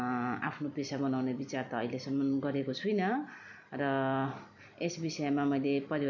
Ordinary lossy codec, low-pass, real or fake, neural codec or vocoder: none; 7.2 kHz; fake; vocoder, 44.1 kHz, 128 mel bands every 256 samples, BigVGAN v2